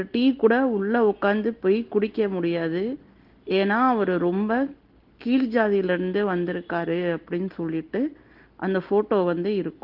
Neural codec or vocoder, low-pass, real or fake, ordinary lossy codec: none; 5.4 kHz; real; Opus, 16 kbps